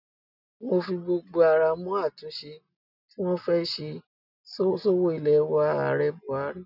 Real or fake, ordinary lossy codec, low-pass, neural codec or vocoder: real; none; 5.4 kHz; none